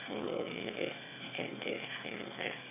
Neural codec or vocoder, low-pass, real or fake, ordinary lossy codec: autoencoder, 22.05 kHz, a latent of 192 numbers a frame, VITS, trained on one speaker; 3.6 kHz; fake; none